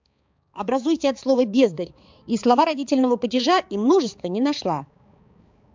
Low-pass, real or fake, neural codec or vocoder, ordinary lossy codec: 7.2 kHz; fake; codec, 16 kHz, 4 kbps, X-Codec, HuBERT features, trained on balanced general audio; MP3, 64 kbps